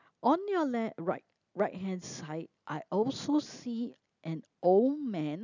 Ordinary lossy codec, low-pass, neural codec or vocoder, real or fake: none; 7.2 kHz; none; real